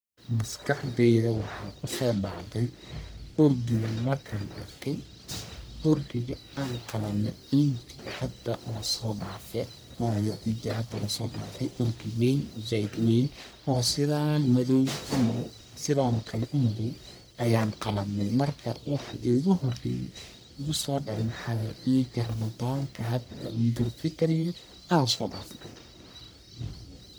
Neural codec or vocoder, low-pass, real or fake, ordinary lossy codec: codec, 44.1 kHz, 1.7 kbps, Pupu-Codec; none; fake; none